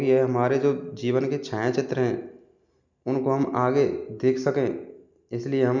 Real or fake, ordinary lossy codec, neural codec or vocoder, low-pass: real; none; none; 7.2 kHz